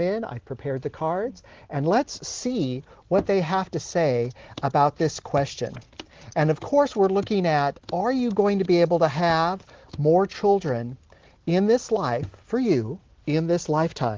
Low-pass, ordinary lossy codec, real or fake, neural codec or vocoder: 7.2 kHz; Opus, 16 kbps; real; none